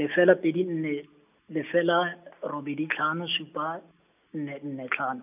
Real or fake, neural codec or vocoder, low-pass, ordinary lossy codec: real; none; 3.6 kHz; none